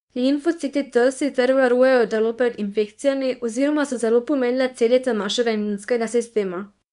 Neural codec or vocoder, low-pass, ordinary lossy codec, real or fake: codec, 24 kHz, 0.9 kbps, WavTokenizer, small release; 10.8 kHz; none; fake